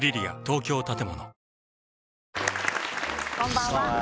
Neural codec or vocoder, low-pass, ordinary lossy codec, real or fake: none; none; none; real